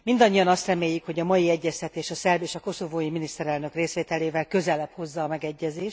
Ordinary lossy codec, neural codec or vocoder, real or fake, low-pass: none; none; real; none